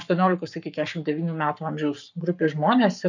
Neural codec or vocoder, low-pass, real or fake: codec, 44.1 kHz, 7.8 kbps, Pupu-Codec; 7.2 kHz; fake